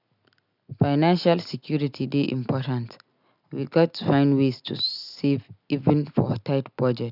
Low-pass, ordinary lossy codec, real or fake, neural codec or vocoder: 5.4 kHz; none; real; none